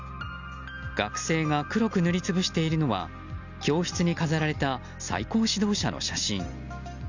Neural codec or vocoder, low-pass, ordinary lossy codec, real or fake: none; 7.2 kHz; none; real